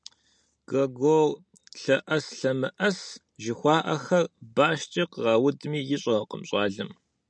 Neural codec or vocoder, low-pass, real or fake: none; 9.9 kHz; real